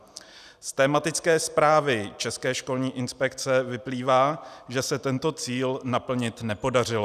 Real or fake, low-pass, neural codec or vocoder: real; 14.4 kHz; none